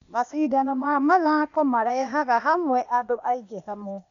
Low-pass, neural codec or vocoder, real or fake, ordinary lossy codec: 7.2 kHz; codec, 16 kHz, 0.8 kbps, ZipCodec; fake; none